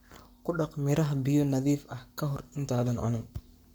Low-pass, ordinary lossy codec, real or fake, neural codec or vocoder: none; none; fake; codec, 44.1 kHz, 7.8 kbps, Pupu-Codec